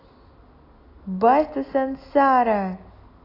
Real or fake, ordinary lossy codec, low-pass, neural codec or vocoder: real; AAC, 24 kbps; 5.4 kHz; none